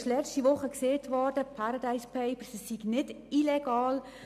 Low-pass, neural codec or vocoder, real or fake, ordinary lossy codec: 14.4 kHz; none; real; none